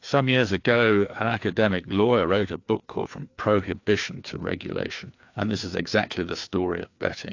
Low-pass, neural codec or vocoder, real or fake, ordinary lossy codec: 7.2 kHz; codec, 16 kHz, 2 kbps, FreqCodec, larger model; fake; AAC, 48 kbps